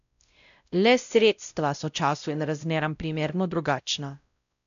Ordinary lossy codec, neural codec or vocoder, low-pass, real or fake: none; codec, 16 kHz, 0.5 kbps, X-Codec, WavLM features, trained on Multilingual LibriSpeech; 7.2 kHz; fake